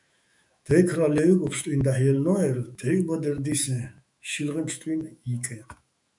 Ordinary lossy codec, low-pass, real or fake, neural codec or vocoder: MP3, 96 kbps; 10.8 kHz; fake; autoencoder, 48 kHz, 128 numbers a frame, DAC-VAE, trained on Japanese speech